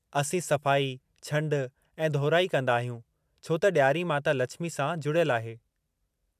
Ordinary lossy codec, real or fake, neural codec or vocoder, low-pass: MP3, 96 kbps; real; none; 14.4 kHz